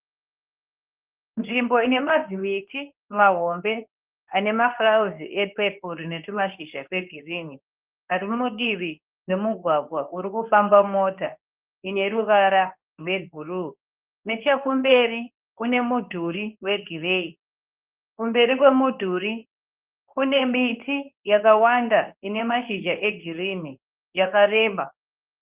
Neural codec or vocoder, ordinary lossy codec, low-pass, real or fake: codec, 24 kHz, 0.9 kbps, WavTokenizer, medium speech release version 2; Opus, 32 kbps; 3.6 kHz; fake